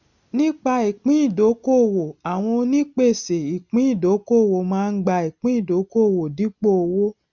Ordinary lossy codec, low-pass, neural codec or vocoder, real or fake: none; 7.2 kHz; none; real